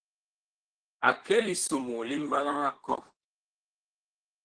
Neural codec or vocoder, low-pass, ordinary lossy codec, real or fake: codec, 24 kHz, 1 kbps, SNAC; 9.9 kHz; Opus, 16 kbps; fake